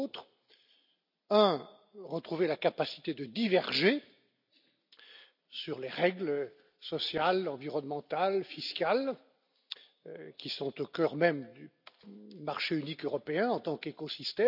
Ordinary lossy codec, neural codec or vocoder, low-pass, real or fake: none; none; 5.4 kHz; real